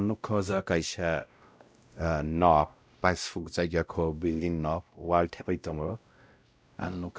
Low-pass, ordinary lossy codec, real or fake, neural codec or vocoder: none; none; fake; codec, 16 kHz, 0.5 kbps, X-Codec, WavLM features, trained on Multilingual LibriSpeech